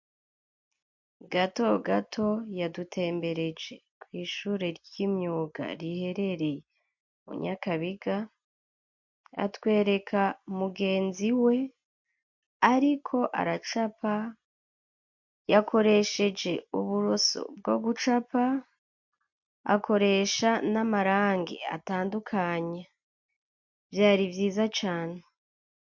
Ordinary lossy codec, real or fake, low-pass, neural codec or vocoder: MP3, 48 kbps; real; 7.2 kHz; none